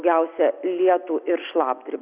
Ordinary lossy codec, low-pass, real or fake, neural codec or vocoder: Opus, 64 kbps; 3.6 kHz; real; none